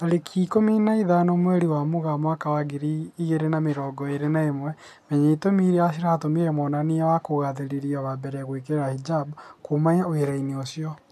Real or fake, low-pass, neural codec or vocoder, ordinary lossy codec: real; 14.4 kHz; none; none